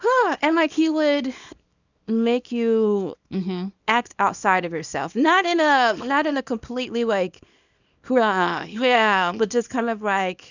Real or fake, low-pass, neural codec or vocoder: fake; 7.2 kHz; codec, 24 kHz, 0.9 kbps, WavTokenizer, small release